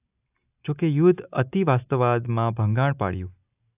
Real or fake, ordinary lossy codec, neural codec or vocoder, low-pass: real; AAC, 32 kbps; none; 3.6 kHz